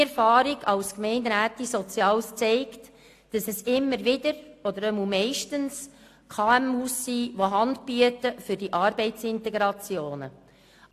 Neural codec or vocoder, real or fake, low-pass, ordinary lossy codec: none; real; 14.4 kHz; AAC, 64 kbps